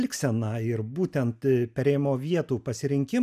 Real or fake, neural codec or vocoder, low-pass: real; none; 14.4 kHz